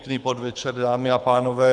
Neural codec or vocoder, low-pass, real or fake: codec, 24 kHz, 6 kbps, HILCodec; 9.9 kHz; fake